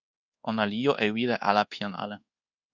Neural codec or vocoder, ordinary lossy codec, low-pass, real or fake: codec, 24 kHz, 1.2 kbps, DualCodec; Opus, 64 kbps; 7.2 kHz; fake